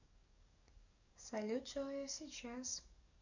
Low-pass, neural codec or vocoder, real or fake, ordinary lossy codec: 7.2 kHz; codec, 44.1 kHz, 7.8 kbps, DAC; fake; none